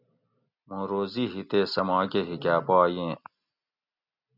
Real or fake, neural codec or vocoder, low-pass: real; none; 5.4 kHz